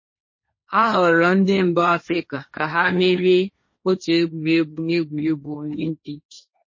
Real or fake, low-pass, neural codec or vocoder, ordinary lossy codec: fake; 7.2 kHz; codec, 16 kHz, 1.1 kbps, Voila-Tokenizer; MP3, 32 kbps